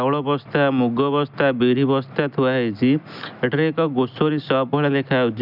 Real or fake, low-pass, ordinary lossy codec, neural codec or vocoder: real; 5.4 kHz; none; none